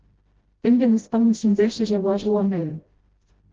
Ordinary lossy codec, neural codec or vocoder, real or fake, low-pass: Opus, 16 kbps; codec, 16 kHz, 0.5 kbps, FreqCodec, smaller model; fake; 7.2 kHz